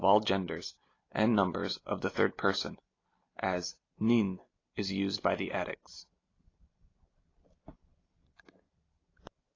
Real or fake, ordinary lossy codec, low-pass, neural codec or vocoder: real; AAC, 32 kbps; 7.2 kHz; none